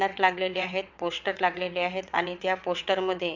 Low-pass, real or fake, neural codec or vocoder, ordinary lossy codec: 7.2 kHz; fake; vocoder, 22.05 kHz, 80 mel bands, WaveNeXt; MP3, 64 kbps